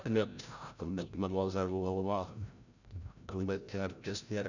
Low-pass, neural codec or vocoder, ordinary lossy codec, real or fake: 7.2 kHz; codec, 16 kHz, 0.5 kbps, FreqCodec, larger model; none; fake